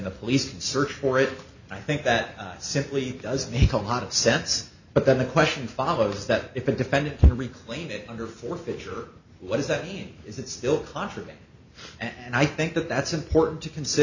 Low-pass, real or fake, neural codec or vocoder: 7.2 kHz; real; none